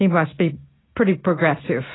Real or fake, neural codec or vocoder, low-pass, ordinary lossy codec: real; none; 7.2 kHz; AAC, 16 kbps